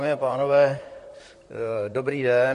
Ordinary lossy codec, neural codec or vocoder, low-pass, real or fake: MP3, 48 kbps; vocoder, 44.1 kHz, 128 mel bands, Pupu-Vocoder; 14.4 kHz; fake